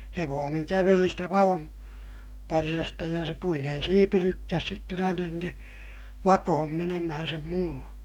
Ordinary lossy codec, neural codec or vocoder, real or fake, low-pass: none; codec, 44.1 kHz, 2.6 kbps, DAC; fake; 19.8 kHz